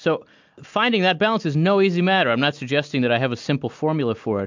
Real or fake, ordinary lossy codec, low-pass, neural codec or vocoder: real; MP3, 64 kbps; 7.2 kHz; none